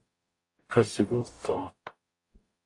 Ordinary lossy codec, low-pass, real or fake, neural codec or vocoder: AAC, 48 kbps; 10.8 kHz; fake; codec, 44.1 kHz, 0.9 kbps, DAC